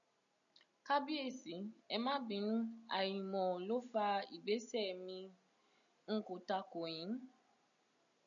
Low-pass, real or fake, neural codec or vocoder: 7.2 kHz; real; none